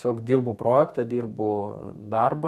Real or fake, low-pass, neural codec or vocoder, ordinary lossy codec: fake; 14.4 kHz; codec, 32 kHz, 1.9 kbps, SNAC; MP3, 64 kbps